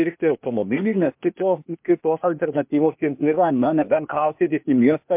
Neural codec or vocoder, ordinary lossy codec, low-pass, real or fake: codec, 16 kHz, 0.8 kbps, ZipCodec; MP3, 32 kbps; 3.6 kHz; fake